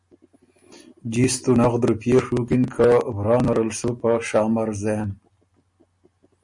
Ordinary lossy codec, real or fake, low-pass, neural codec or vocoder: MP3, 48 kbps; real; 10.8 kHz; none